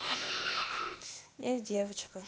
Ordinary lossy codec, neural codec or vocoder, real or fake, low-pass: none; codec, 16 kHz, 0.8 kbps, ZipCodec; fake; none